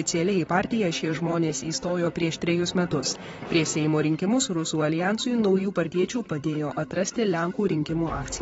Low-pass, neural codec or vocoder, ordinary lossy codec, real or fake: 19.8 kHz; vocoder, 44.1 kHz, 128 mel bands, Pupu-Vocoder; AAC, 24 kbps; fake